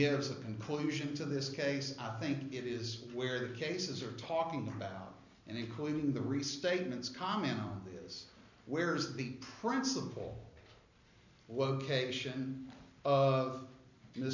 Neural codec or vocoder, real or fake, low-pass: none; real; 7.2 kHz